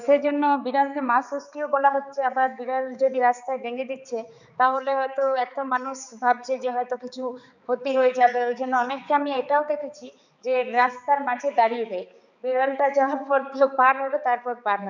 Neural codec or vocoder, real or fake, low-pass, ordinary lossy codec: codec, 16 kHz, 4 kbps, X-Codec, HuBERT features, trained on balanced general audio; fake; 7.2 kHz; none